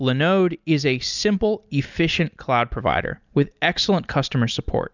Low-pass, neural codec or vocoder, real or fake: 7.2 kHz; none; real